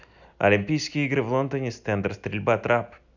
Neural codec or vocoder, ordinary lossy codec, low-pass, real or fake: none; none; 7.2 kHz; real